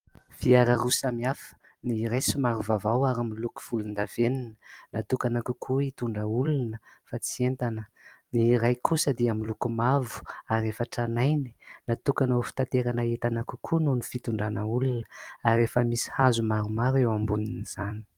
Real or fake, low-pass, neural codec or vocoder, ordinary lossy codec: fake; 19.8 kHz; vocoder, 44.1 kHz, 128 mel bands every 256 samples, BigVGAN v2; Opus, 32 kbps